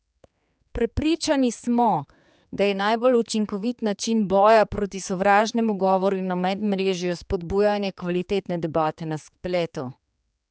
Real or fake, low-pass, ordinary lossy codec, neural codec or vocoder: fake; none; none; codec, 16 kHz, 4 kbps, X-Codec, HuBERT features, trained on general audio